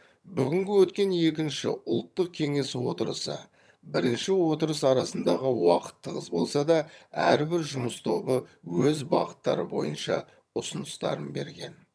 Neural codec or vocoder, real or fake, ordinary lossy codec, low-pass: vocoder, 22.05 kHz, 80 mel bands, HiFi-GAN; fake; none; none